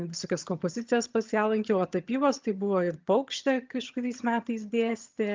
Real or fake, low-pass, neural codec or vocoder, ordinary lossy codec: fake; 7.2 kHz; vocoder, 22.05 kHz, 80 mel bands, HiFi-GAN; Opus, 24 kbps